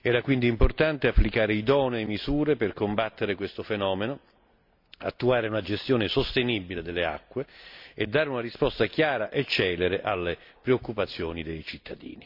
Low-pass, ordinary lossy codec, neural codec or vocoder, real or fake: 5.4 kHz; MP3, 48 kbps; none; real